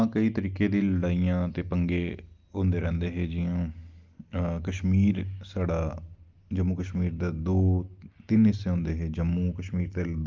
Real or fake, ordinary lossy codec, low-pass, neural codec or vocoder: real; Opus, 32 kbps; 7.2 kHz; none